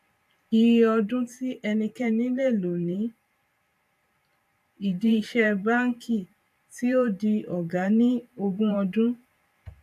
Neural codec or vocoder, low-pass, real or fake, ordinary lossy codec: vocoder, 44.1 kHz, 128 mel bands every 512 samples, BigVGAN v2; 14.4 kHz; fake; AAC, 96 kbps